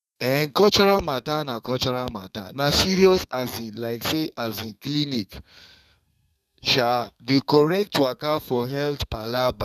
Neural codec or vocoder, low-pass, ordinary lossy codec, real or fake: codec, 32 kHz, 1.9 kbps, SNAC; 14.4 kHz; none; fake